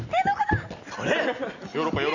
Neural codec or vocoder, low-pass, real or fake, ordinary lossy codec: none; 7.2 kHz; real; none